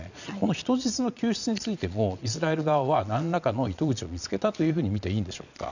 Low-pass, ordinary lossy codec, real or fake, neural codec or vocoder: 7.2 kHz; none; fake; vocoder, 22.05 kHz, 80 mel bands, Vocos